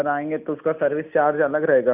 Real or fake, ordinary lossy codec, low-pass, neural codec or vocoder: real; none; 3.6 kHz; none